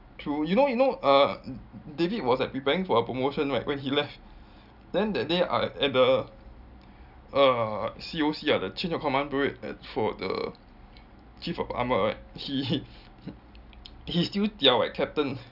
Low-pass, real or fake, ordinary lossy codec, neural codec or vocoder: 5.4 kHz; real; none; none